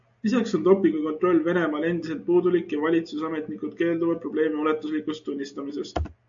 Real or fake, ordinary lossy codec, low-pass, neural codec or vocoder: real; AAC, 64 kbps; 7.2 kHz; none